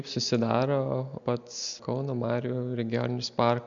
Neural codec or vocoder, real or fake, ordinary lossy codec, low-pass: none; real; MP3, 64 kbps; 7.2 kHz